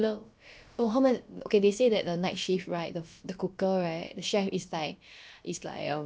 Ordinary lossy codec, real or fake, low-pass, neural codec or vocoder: none; fake; none; codec, 16 kHz, about 1 kbps, DyCAST, with the encoder's durations